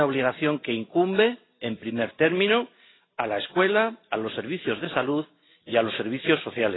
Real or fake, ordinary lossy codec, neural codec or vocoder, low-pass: real; AAC, 16 kbps; none; 7.2 kHz